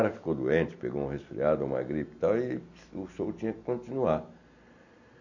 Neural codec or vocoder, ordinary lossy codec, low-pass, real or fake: none; none; 7.2 kHz; real